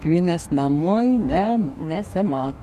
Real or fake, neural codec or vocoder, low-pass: fake; codec, 44.1 kHz, 2.6 kbps, DAC; 14.4 kHz